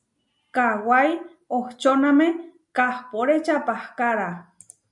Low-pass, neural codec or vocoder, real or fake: 10.8 kHz; none; real